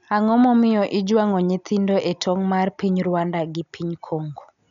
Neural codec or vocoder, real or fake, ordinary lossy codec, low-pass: none; real; none; 7.2 kHz